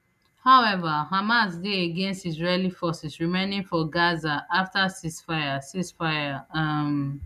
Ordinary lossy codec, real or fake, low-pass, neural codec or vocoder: none; real; 14.4 kHz; none